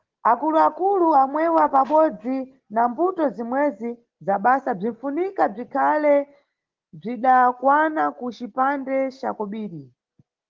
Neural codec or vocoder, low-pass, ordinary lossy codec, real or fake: none; 7.2 kHz; Opus, 16 kbps; real